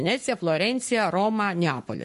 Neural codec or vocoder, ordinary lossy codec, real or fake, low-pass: codec, 44.1 kHz, 7.8 kbps, Pupu-Codec; MP3, 48 kbps; fake; 14.4 kHz